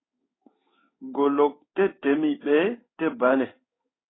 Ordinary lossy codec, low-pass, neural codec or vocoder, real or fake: AAC, 16 kbps; 7.2 kHz; codec, 16 kHz in and 24 kHz out, 1 kbps, XY-Tokenizer; fake